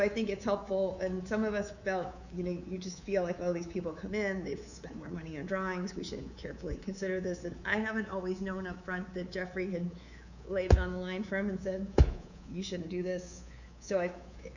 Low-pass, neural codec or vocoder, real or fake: 7.2 kHz; codec, 24 kHz, 3.1 kbps, DualCodec; fake